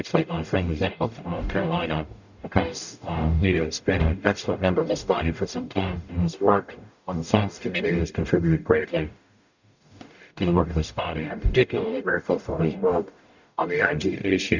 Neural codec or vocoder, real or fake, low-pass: codec, 44.1 kHz, 0.9 kbps, DAC; fake; 7.2 kHz